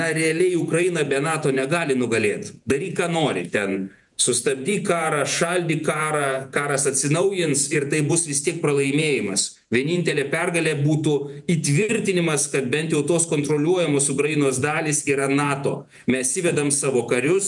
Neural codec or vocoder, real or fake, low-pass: vocoder, 48 kHz, 128 mel bands, Vocos; fake; 10.8 kHz